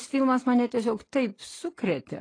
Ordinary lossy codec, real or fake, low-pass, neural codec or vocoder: AAC, 32 kbps; real; 9.9 kHz; none